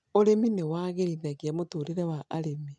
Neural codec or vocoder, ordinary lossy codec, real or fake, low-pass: none; none; real; none